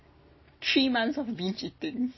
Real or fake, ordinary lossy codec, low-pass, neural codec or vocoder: real; MP3, 24 kbps; 7.2 kHz; none